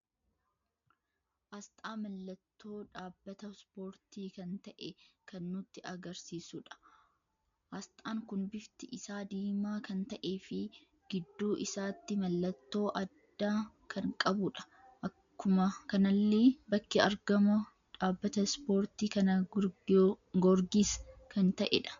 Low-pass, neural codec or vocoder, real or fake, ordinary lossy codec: 7.2 kHz; none; real; MP3, 64 kbps